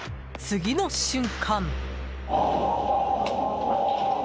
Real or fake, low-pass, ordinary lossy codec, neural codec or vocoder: real; none; none; none